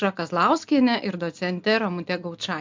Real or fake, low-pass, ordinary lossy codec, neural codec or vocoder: real; 7.2 kHz; MP3, 64 kbps; none